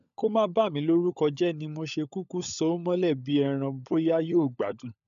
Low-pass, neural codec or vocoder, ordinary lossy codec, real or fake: 7.2 kHz; codec, 16 kHz, 16 kbps, FunCodec, trained on LibriTTS, 50 frames a second; none; fake